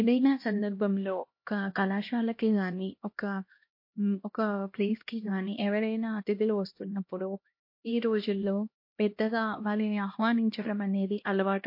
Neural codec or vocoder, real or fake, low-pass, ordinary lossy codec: codec, 16 kHz, 1 kbps, X-Codec, HuBERT features, trained on LibriSpeech; fake; 5.4 kHz; MP3, 32 kbps